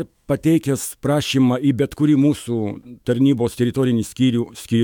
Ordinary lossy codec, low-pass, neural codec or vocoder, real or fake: MP3, 96 kbps; 19.8 kHz; autoencoder, 48 kHz, 128 numbers a frame, DAC-VAE, trained on Japanese speech; fake